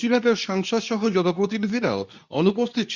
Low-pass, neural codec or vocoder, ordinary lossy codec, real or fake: 7.2 kHz; codec, 24 kHz, 0.9 kbps, WavTokenizer, medium speech release version 2; none; fake